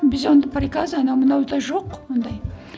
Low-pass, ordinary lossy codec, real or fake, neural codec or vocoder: none; none; real; none